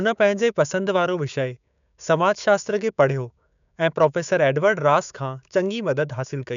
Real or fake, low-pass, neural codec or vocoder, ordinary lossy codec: fake; 7.2 kHz; codec, 16 kHz, 6 kbps, DAC; none